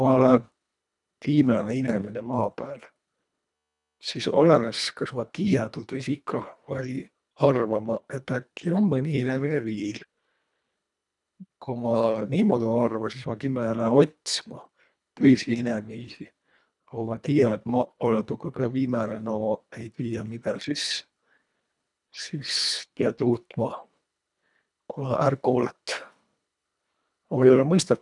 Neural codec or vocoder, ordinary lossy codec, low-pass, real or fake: codec, 24 kHz, 1.5 kbps, HILCodec; none; 10.8 kHz; fake